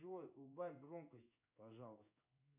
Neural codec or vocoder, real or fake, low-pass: codec, 16 kHz in and 24 kHz out, 1 kbps, XY-Tokenizer; fake; 3.6 kHz